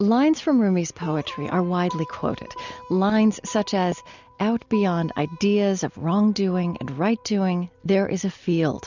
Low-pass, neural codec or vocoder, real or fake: 7.2 kHz; none; real